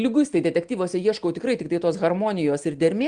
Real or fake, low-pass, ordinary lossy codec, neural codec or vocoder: real; 9.9 kHz; Opus, 24 kbps; none